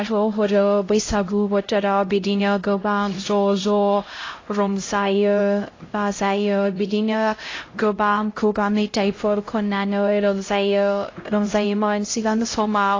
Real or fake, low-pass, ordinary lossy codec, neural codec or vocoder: fake; 7.2 kHz; AAC, 32 kbps; codec, 16 kHz, 0.5 kbps, X-Codec, HuBERT features, trained on LibriSpeech